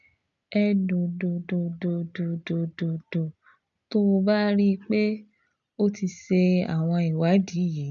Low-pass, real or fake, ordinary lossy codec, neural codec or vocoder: 7.2 kHz; real; none; none